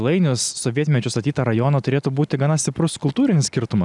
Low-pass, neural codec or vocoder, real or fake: 10.8 kHz; none; real